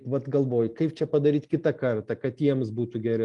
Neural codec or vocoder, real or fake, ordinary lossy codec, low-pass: none; real; Opus, 32 kbps; 10.8 kHz